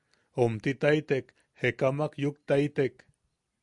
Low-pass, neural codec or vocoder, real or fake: 10.8 kHz; none; real